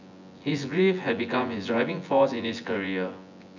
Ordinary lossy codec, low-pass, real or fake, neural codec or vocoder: none; 7.2 kHz; fake; vocoder, 24 kHz, 100 mel bands, Vocos